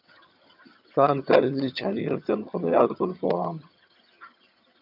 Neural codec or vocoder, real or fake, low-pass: vocoder, 22.05 kHz, 80 mel bands, HiFi-GAN; fake; 5.4 kHz